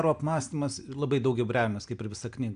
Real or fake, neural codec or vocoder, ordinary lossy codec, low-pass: real; none; AAC, 96 kbps; 9.9 kHz